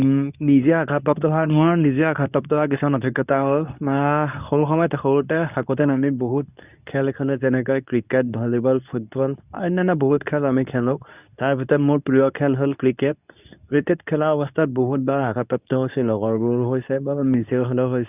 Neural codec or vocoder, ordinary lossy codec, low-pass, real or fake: codec, 24 kHz, 0.9 kbps, WavTokenizer, medium speech release version 2; none; 3.6 kHz; fake